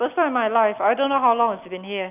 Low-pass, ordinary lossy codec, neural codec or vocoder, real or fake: 3.6 kHz; none; none; real